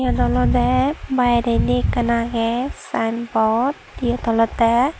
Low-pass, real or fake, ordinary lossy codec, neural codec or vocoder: none; real; none; none